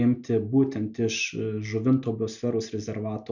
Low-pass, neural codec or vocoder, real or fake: 7.2 kHz; none; real